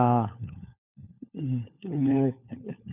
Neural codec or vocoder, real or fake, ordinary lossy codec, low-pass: codec, 16 kHz, 4 kbps, FunCodec, trained on LibriTTS, 50 frames a second; fake; none; 3.6 kHz